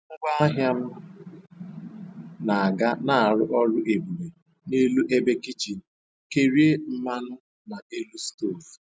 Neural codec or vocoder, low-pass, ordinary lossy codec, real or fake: none; none; none; real